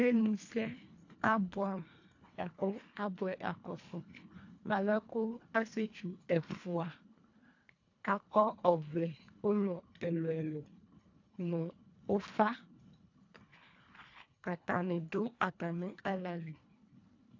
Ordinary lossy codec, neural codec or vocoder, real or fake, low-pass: AAC, 48 kbps; codec, 24 kHz, 1.5 kbps, HILCodec; fake; 7.2 kHz